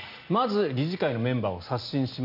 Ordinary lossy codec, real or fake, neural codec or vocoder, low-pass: none; real; none; 5.4 kHz